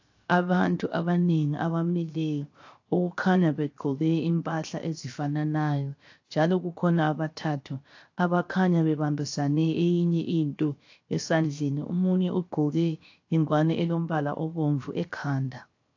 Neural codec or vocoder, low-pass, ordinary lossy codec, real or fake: codec, 16 kHz, 0.7 kbps, FocalCodec; 7.2 kHz; AAC, 48 kbps; fake